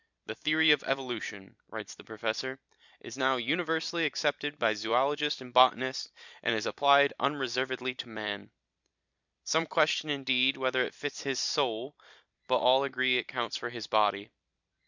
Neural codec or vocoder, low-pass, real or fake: vocoder, 44.1 kHz, 128 mel bands every 256 samples, BigVGAN v2; 7.2 kHz; fake